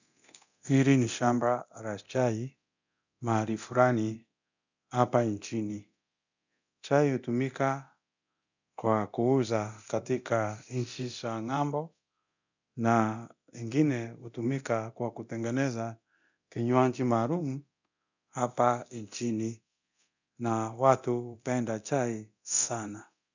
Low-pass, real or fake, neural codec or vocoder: 7.2 kHz; fake; codec, 24 kHz, 0.9 kbps, DualCodec